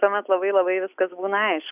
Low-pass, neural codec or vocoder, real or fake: 3.6 kHz; none; real